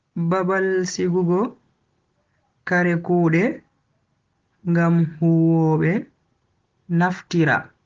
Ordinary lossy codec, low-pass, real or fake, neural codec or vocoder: Opus, 16 kbps; 7.2 kHz; real; none